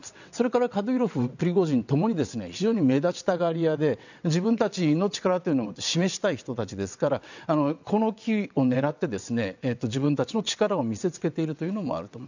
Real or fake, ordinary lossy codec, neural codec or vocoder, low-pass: fake; none; vocoder, 22.05 kHz, 80 mel bands, WaveNeXt; 7.2 kHz